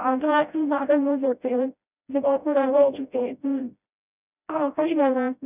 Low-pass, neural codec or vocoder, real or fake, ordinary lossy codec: 3.6 kHz; codec, 16 kHz, 0.5 kbps, FreqCodec, smaller model; fake; none